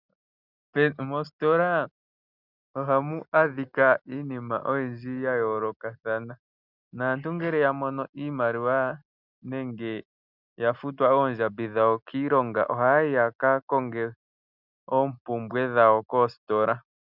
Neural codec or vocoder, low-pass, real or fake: none; 5.4 kHz; real